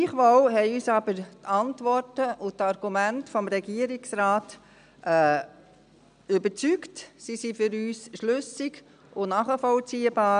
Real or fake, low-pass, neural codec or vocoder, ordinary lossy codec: real; 9.9 kHz; none; none